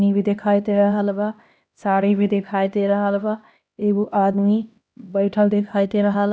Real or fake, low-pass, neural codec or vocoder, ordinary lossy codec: fake; none; codec, 16 kHz, 1 kbps, X-Codec, HuBERT features, trained on LibriSpeech; none